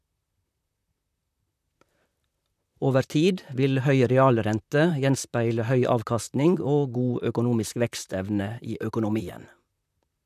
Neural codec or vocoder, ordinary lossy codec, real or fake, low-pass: vocoder, 44.1 kHz, 128 mel bands, Pupu-Vocoder; none; fake; 14.4 kHz